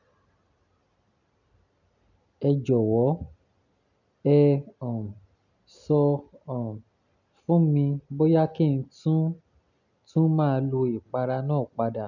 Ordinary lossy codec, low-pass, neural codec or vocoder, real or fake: none; 7.2 kHz; none; real